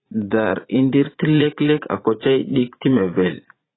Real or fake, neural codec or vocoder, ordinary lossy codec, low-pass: fake; vocoder, 44.1 kHz, 80 mel bands, Vocos; AAC, 16 kbps; 7.2 kHz